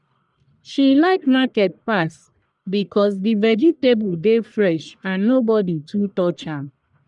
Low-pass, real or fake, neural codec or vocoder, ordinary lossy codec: 10.8 kHz; fake; codec, 44.1 kHz, 1.7 kbps, Pupu-Codec; none